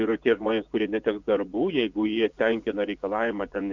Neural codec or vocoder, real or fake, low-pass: vocoder, 24 kHz, 100 mel bands, Vocos; fake; 7.2 kHz